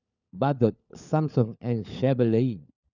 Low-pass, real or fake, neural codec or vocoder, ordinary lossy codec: 7.2 kHz; fake; codec, 16 kHz, 4 kbps, FunCodec, trained on LibriTTS, 50 frames a second; none